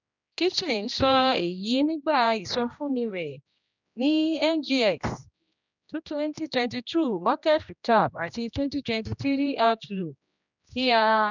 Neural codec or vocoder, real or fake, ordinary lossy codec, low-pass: codec, 16 kHz, 1 kbps, X-Codec, HuBERT features, trained on general audio; fake; none; 7.2 kHz